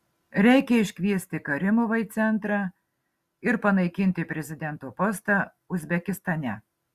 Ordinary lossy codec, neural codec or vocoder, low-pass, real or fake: Opus, 64 kbps; none; 14.4 kHz; real